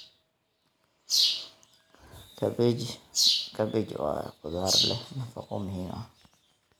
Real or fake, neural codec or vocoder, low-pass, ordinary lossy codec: real; none; none; none